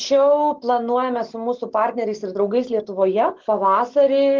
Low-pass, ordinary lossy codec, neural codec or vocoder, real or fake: 7.2 kHz; Opus, 16 kbps; none; real